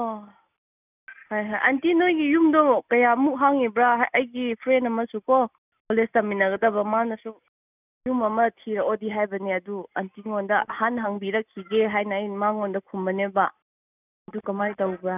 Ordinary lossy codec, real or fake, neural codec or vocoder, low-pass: none; real; none; 3.6 kHz